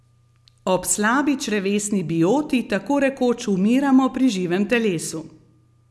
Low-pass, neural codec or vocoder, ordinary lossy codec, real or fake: none; none; none; real